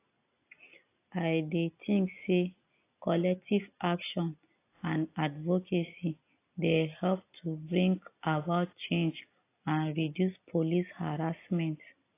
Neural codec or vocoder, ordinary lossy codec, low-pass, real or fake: none; AAC, 24 kbps; 3.6 kHz; real